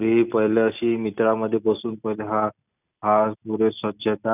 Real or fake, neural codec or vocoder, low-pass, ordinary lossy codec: real; none; 3.6 kHz; none